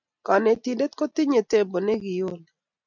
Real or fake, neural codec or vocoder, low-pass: real; none; 7.2 kHz